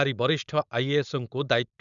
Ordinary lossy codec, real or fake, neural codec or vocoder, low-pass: none; fake; codec, 16 kHz, 4.8 kbps, FACodec; 7.2 kHz